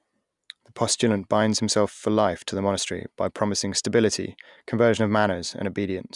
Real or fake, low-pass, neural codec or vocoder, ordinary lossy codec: real; 10.8 kHz; none; none